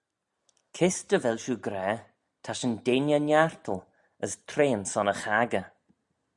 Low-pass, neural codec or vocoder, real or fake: 10.8 kHz; none; real